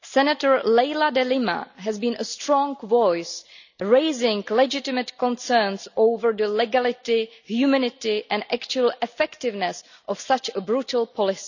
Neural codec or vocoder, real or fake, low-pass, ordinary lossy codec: none; real; 7.2 kHz; none